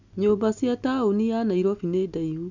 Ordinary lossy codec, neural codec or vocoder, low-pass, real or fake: none; none; 7.2 kHz; real